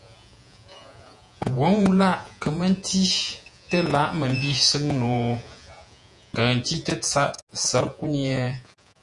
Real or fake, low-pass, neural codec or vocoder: fake; 10.8 kHz; vocoder, 48 kHz, 128 mel bands, Vocos